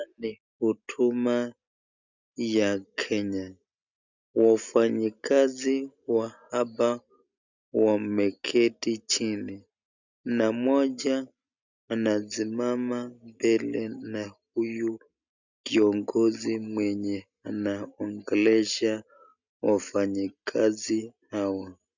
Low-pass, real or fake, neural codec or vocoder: 7.2 kHz; real; none